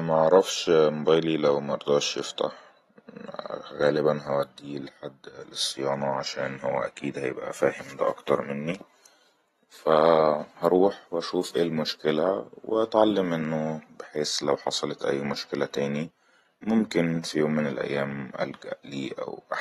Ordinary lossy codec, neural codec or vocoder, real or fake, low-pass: AAC, 32 kbps; none; real; 19.8 kHz